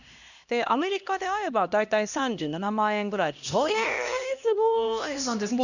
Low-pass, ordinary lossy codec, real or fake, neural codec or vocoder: 7.2 kHz; none; fake; codec, 16 kHz, 1 kbps, X-Codec, HuBERT features, trained on LibriSpeech